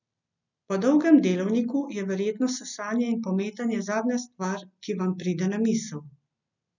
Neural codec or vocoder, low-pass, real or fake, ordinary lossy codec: none; 7.2 kHz; real; none